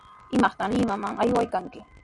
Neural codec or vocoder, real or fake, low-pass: none; real; 10.8 kHz